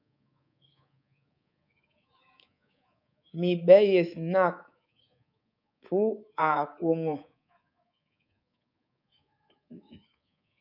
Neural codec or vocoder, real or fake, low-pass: codec, 24 kHz, 3.1 kbps, DualCodec; fake; 5.4 kHz